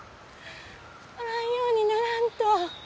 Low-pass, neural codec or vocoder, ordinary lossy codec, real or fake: none; none; none; real